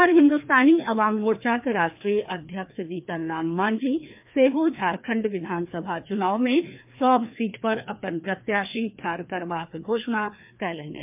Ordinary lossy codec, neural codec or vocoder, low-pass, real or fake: MP3, 32 kbps; codec, 16 kHz, 2 kbps, FreqCodec, larger model; 3.6 kHz; fake